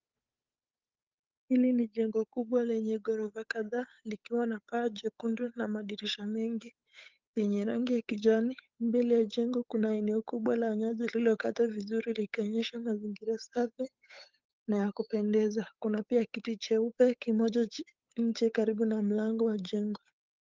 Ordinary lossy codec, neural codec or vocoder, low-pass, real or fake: Opus, 24 kbps; codec, 16 kHz, 8 kbps, FunCodec, trained on Chinese and English, 25 frames a second; 7.2 kHz; fake